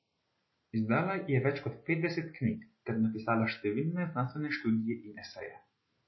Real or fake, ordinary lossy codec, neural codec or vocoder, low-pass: real; MP3, 24 kbps; none; 7.2 kHz